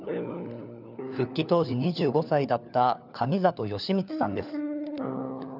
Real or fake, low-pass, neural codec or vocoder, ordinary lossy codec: fake; 5.4 kHz; codec, 16 kHz, 4 kbps, FunCodec, trained on LibriTTS, 50 frames a second; none